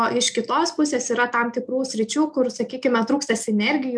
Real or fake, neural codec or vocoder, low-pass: real; none; 9.9 kHz